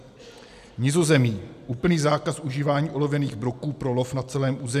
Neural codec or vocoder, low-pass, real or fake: none; 14.4 kHz; real